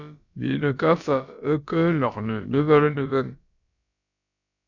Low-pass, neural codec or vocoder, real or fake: 7.2 kHz; codec, 16 kHz, about 1 kbps, DyCAST, with the encoder's durations; fake